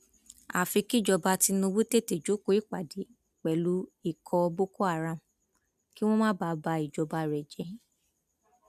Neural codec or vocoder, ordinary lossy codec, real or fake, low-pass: none; none; real; 14.4 kHz